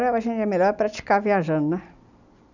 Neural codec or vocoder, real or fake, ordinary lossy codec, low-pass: none; real; none; 7.2 kHz